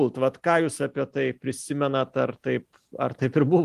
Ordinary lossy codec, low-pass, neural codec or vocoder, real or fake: Opus, 24 kbps; 14.4 kHz; none; real